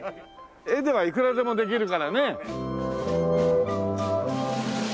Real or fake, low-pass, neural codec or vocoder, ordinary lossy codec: real; none; none; none